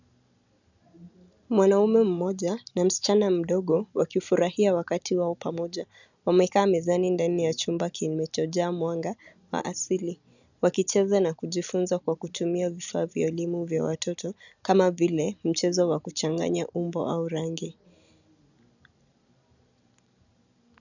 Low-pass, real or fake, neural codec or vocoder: 7.2 kHz; real; none